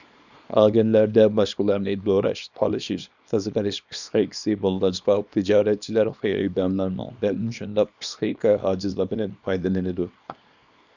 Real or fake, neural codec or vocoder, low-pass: fake; codec, 24 kHz, 0.9 kbps, WavTokenizer, small release; 7.2 kHz